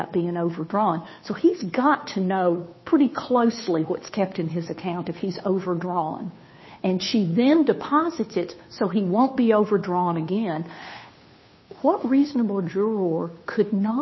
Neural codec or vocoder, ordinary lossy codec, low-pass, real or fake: codec, 16 kHz, 2 kbps, FunCodec, trained on Chinese and English, 25 frames a second; MP3, 24 kbps; 7.2 kHz; fake